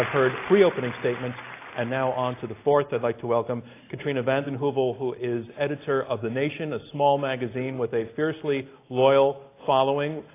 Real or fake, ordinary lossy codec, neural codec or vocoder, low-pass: real; AAC, 24 kbps; none; 3.6 kHz